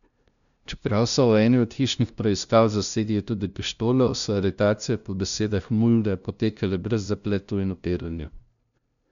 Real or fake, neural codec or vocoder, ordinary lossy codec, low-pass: fake; codec, 16 kHz, 0.5 kbps, FunCodec, trained on LibriTTS, 25 frames a second; none; 7.2 kHz